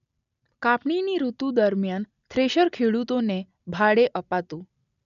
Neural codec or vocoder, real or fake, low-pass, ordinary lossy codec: none; real; 7.2 kHz; none